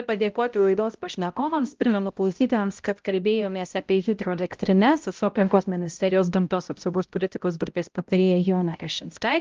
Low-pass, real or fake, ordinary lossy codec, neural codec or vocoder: 7.2 kHz; fake; Opus, 32 kbps; codec, 16 kHz, 0.5 kbps, X-Codec, HuBERT features, trained on balanced general audio